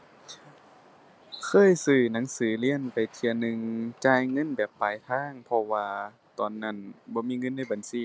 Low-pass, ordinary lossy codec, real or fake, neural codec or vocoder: none; none; real; none